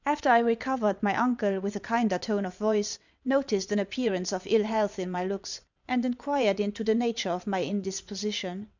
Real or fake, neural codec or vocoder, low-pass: fake; vocoder, 22.05 kHz, 80 mel bands, WaveNeXt; 7.2 kHz